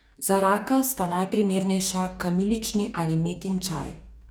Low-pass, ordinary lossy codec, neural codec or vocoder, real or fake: none; none; codec, 44.1 kHz, 2.6 kbps, DAC; fake